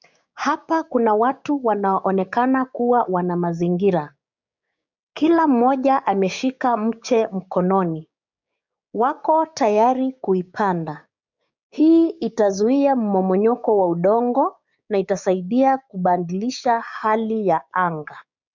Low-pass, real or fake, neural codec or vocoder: 7.2 kHz; fake; codec, 16 kHz, 6 kbps, DAC